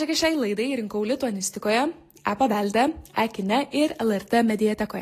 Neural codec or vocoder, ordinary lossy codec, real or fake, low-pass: none; AAC, 48 kbps; real; 14.4 kHz